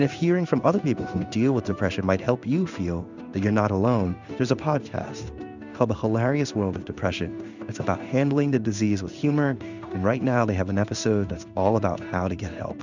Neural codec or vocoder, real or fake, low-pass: codec, 16 kHz in and 24 kHz out, 1 kbps, XY-Tokenizer; fake; 7.2 kHz